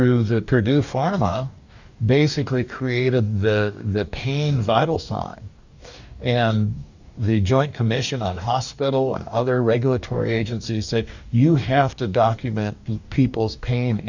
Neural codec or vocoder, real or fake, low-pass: codec, 44.1 kHz, 2.6 kbps, DAC; fake; 7.2 kHz